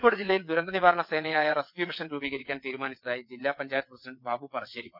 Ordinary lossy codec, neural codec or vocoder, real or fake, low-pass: none; vocoder, 22.05 kHz, 80 mel bands, WaveNeXt; fake; 5.4 kHz